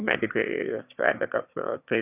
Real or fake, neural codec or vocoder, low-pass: fake; autoencoder, 22.05 kHz, a latent of 192 numbers a frame, VITS, trained on one speaker; 3.6 kHz